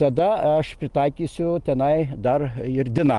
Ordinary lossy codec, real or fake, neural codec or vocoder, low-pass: Opus, 24 kbps; real; none; 10.8 kHz